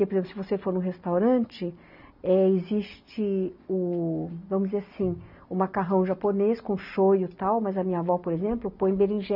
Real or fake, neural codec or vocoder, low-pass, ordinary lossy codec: real; none; 5.4 kHz; none